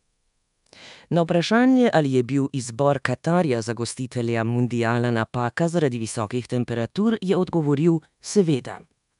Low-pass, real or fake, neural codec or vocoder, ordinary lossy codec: 10.8 kHz; fake; codec, 24 kHz, 1.2 kbps, DualCodec; none